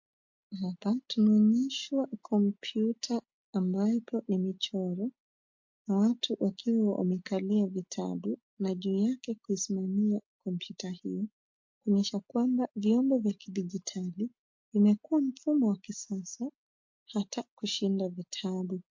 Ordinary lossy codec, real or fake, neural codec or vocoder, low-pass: MP3, 48 kbps; real; none; 7.2 kHz